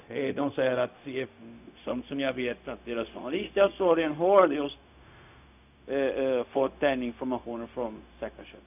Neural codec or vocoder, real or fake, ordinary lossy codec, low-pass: codec, 16 kHz, 0.4 kbps, LongCat-Audio-Codec; fake; none; 3.6 kHz